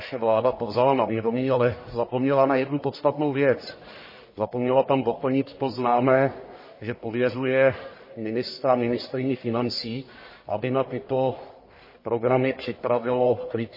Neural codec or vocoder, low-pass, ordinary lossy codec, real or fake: codec, 44.1 kHz, 1.7 kbps, Pupu-Codec; 5.4 kHz; MP3, 24 kbps; fake